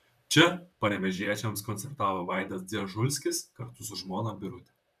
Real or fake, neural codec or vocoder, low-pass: fake; vocoder, 44.1 kHz, 128 mel bands, Pupu-Vocoder; 14.4 kHz